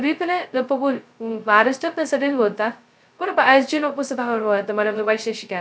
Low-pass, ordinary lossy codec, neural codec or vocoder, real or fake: none; none; codec, 16 kHz, 0.2 kbps, FocalCodec; fake